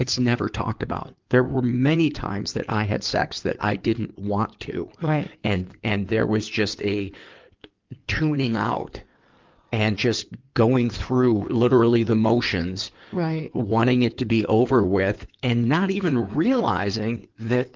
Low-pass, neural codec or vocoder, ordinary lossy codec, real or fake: 7.2 kHz; codec, 16 kHz in and 24 kHz out, 2.2 kbps, FireRedTTS-2 codec; Opus, 32 kbps; fake